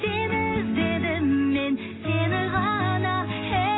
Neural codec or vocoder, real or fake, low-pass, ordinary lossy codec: none; real; 7.2 kHz; AAC, 16 kbps